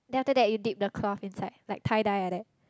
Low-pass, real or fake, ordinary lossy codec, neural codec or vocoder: none; real; none; none